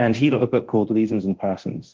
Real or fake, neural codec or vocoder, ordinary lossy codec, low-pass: fake; codec, 24 kHz, 0.9 kbps, DualCodec; Opus, 16 kbps; 7.2 kHz